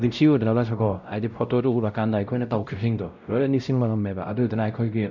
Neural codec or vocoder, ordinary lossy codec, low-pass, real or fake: codec, 16 kHz, 0.5 kbps, X-Codec, WavLM features, trained on Multilingual LibriSpeech; none; 7.2 kHz; fake